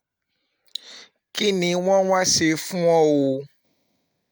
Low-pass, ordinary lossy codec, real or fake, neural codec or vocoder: none; none; real; none